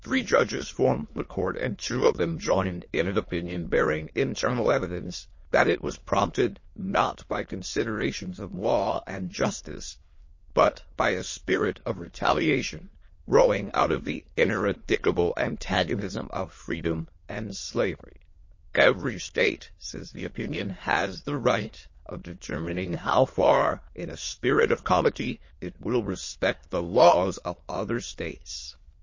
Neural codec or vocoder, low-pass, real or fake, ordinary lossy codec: autoencoder, 22.05 kHz, a latent of 192 numbers a frame, VITS, trained on many speakers; 7.2 kHz; fake; MP3, 32 kbps